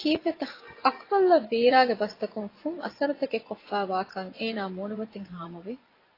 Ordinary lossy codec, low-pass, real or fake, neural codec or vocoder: AAC, 24 kbps; 5.4 kHz; fake; vocoder, 24 kHz, 100 mel bands, Vocos